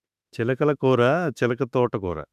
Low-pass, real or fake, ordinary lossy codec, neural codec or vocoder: 14.4 kHz; fake; none; autoencoder, 48 kHz, 32 numbers a frame, DAC-VAE, trained on Japanese speech